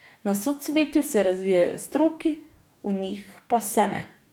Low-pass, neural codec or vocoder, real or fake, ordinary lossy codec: 19.8 kHz; codec, 44.1 kHz, 2.6 kbps, DAC; fake; none